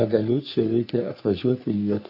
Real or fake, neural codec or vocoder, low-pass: fake; codec, 44.1 kHz, 3.4 kbps, Pupu-Codec; 5.4 kHz